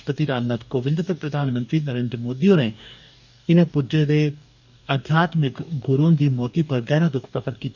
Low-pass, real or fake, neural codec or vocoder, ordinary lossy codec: 7.2 kHz; fake; codec, 44.1 kHz, 2.6 kbps, DAC; none